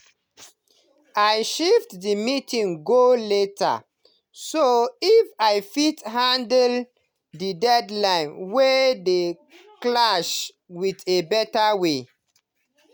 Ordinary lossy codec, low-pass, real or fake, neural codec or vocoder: none; none; real; none